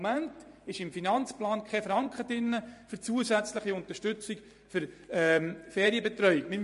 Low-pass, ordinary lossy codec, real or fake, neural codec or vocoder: 14.4 kHz; MP3, 48 kbps; fake; vocoder, 44.1 kHz, 128 mel bands every 512 samples, BigVGAN v2